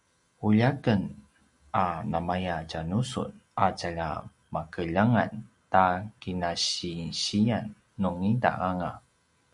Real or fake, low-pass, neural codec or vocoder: real; 10.8 kHz; none